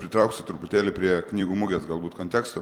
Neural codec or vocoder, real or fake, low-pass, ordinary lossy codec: vocoder, 44.1 kHz, 128 mel bands every 256 samples, BigVGAN v2; fake; 19.8 kHz; Opus, 32 kbps